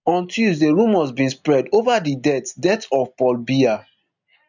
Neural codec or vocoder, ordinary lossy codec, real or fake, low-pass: none; none; real; 7.2 kHz